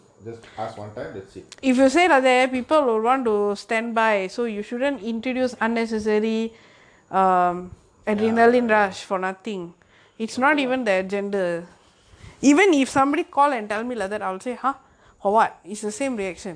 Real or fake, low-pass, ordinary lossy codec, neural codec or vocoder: real; 9.9 kHz; AAC, 64 kbps; none